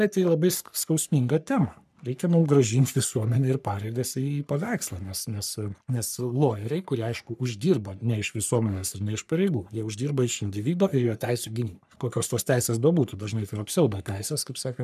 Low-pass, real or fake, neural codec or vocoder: 14.4 kHz; fake; codec, 44.1 kHz, 3.4 kbps, Pupu-Codec